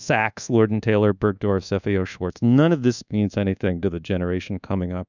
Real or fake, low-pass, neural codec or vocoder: fake; 7.2 kHz; codec, 24 kHz, 1.2 kbps, DualCodec